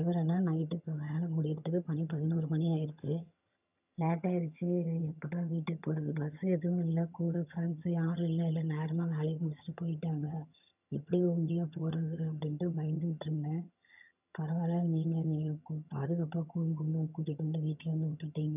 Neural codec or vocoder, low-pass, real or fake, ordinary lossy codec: vocoder, 22.05 kHz, 80 mel bands, HiFi-GAN; 3.6 kHz; fake; none